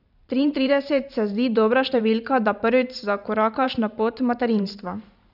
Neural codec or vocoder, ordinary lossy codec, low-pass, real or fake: vocoder, 44.1 kHz, 128 mel bands every 512 samples, BigVGAN v2; none; 5.4 kHz; fake